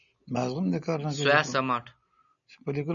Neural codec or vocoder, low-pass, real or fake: none; 7.2 kHz; real